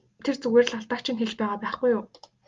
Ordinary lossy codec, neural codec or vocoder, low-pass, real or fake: Opus, 32 kbps; none; 7.2 kHz; real